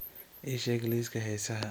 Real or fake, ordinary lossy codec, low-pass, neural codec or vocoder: real; none; none; none